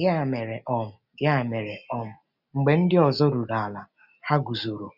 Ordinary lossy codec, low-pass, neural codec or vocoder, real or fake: none; 5.4 kHz; none; real